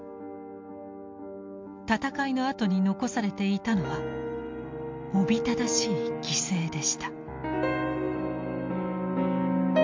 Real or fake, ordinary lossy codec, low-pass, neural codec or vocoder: real; MP3, 64 kbps; 7.2 kHz; none